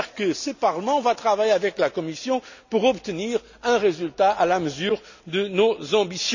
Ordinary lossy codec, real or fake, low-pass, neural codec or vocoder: none; real; 7.2 kHz; none